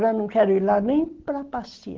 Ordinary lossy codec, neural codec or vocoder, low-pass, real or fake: Opus, 16 kbps; none; 7.2 kHz; real